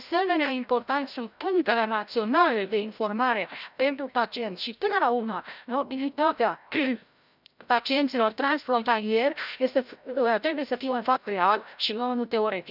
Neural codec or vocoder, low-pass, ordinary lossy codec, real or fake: codec, 16 kHz, 0.5 kbps, FreqCodec, larger model; 5.4 kHz; none; fake